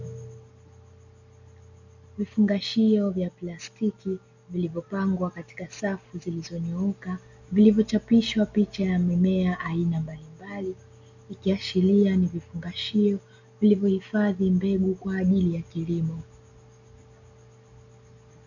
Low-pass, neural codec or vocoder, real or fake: 7.2 kHz; none; real